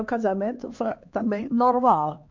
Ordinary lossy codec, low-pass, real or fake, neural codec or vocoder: MP3, 48 kbps; 7.2 kHz; fake; codec, 16 kHz, 2 kbps, X-Codec, HuBERT features, trained on LibriSpeech